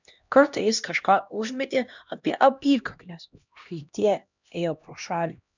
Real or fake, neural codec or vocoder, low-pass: fake; codec, 16 kHz, 1 kbps, X-Codec, HuBERT features, trained on LibriSpeech; 7.2 kHz